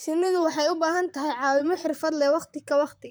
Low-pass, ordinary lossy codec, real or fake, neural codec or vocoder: none; none; fake; vocoder, 44.1 kHz, 128 mel bands, Pupu-Vocoder